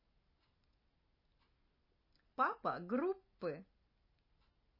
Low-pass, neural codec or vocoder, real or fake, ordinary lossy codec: 5.4 kHz; none; real; MP3, 24 kbps